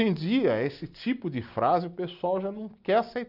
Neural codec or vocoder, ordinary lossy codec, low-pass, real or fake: none; none; 5.4 kHz; real